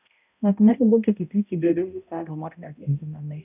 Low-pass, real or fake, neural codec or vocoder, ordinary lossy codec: 3.6 kHz; fake; codec, 16 kHz, 0.5 kbps, X-Codec, HuBERT features, trained on balanced general audio; none